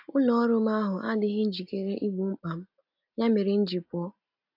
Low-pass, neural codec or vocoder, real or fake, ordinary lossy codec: 5.4 kHz; none; real; AAC, 48 kbps